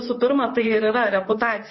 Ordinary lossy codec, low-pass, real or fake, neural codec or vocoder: MP3, 24 kbps; 7.2 kHz; fake; vocoder, 44.1 kHz, 128 mel bands, Pupu-Vocoder